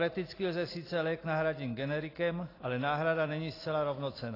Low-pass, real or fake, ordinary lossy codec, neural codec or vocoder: 5.4 kHz; real; AAC, 24 kbps; none